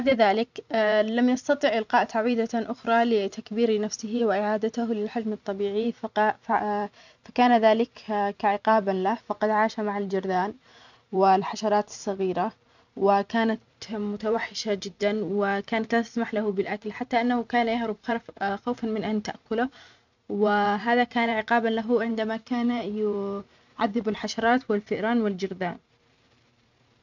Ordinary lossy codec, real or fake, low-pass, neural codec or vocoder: none; fake; 7.2 kHz; vocoder, 44.1 kHz, 128 mel bands every 512 samples, BigVGAN v2